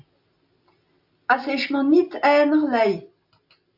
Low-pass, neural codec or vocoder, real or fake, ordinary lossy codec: 5.4 kHz; none; real; AAC, 48 kbps